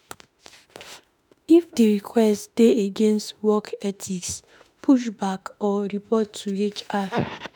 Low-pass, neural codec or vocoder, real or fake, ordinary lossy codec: none; autoencoder, 48 kHz, 32 numbers a frame, DAC-VAE, trained on Japanese speech; fake; none